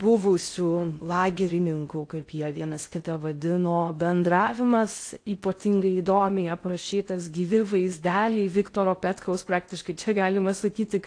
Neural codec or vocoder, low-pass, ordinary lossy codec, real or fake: codec, 16 kHz in and 24 kHz out, 0.6 kbps, FocalCodec, streaming, 2048 codes; 9.9 kHz; AAC, 48 kbps; fake